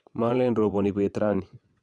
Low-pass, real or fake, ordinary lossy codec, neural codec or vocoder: none; fake; none; vocoder, 22.05 kHz, 80 mel bands, WaveNeXt